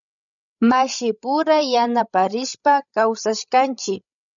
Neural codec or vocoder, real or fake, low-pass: codec, 16 kHz, 16 kbps, FreqCodec, larger model; fake; 7.2 kHz